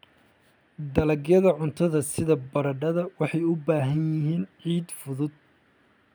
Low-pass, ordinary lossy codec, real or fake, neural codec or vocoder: none; none; real; none